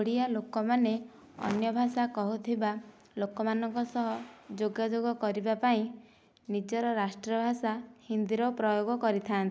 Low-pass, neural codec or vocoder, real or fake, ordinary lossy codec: none; none; real; none